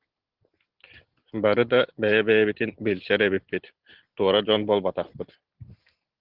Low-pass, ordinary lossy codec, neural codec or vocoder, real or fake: 5.4 kHz; Opus, 16 kbps; none; real